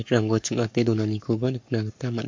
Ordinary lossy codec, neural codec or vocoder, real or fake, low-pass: MP3, 48 kbps; codec, 44.1 kHz, 7.8 kbps, Pupu-Codec; fake; 7.2 kHz